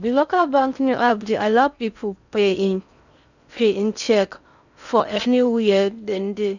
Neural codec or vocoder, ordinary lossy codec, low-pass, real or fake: codec, 16 kHz in and 24 kHz out, 0.6 kbps, FocalCodec, streaming, 4096 codes; none; 7.2 kHz; fake